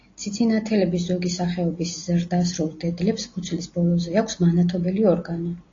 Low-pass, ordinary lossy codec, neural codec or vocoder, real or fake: 7.2 kHz; AAC, 32 kbps; none; real